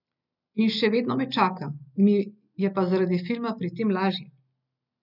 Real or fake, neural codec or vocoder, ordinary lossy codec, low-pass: real; none; none; 5.4 kHz